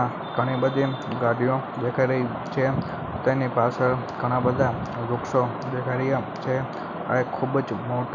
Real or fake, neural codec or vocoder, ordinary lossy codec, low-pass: real; none; none; 7.2 kHz